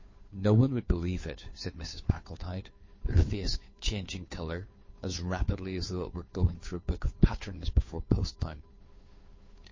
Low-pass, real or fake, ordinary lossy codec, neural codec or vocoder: 7.2 kHz; fake; MP3, 32 kbps; codec, 16 kHz, 2 kbps, FunCodec, trained on Chinese and English, 25 frames a second